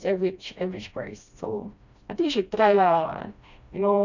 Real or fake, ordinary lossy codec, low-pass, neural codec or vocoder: fake; none; 7.2 kHz; codec, 16 kHz, 1 kbps, FreqCodec, smaller model